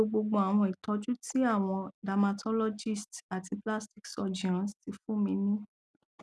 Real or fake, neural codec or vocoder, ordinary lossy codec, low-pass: real; none; none; none